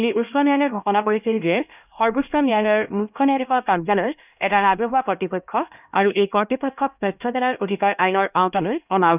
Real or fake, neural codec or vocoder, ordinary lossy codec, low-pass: fake; codec, 16 kHz, 1 kbps, X-Codec, HuBERT features, trained on LibriSpeech; none; 3.6 kHz